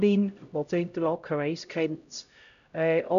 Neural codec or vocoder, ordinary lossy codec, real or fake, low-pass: codec, 16 kHz, 0.5 kbps, X-Codec, HuBERT features, trained on LibriSpeech; none; fake; 7.2 kHz